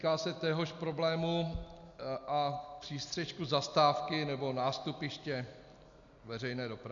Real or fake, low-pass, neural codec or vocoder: real; 7.2 kHz; none